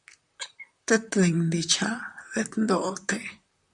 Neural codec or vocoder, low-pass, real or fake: vocoder, 44.1 kHz, 128 mel bands, Pupu-Vocoder; 10.8 kHz; fake